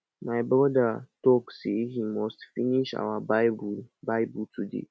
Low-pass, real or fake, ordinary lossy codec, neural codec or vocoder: none; real; none; none